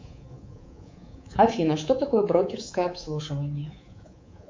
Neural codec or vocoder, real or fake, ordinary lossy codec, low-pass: codec, 24 kHz, 3.1 kbps, DualCodec; fake; MP3, 48 kbps; 7.2 kHz